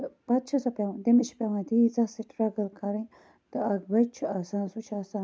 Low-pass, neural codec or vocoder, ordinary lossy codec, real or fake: none; none; none; real